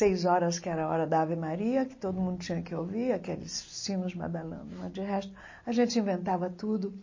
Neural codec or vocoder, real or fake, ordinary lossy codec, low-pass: none; real; MP3, 32 kbps; 7.2 kHz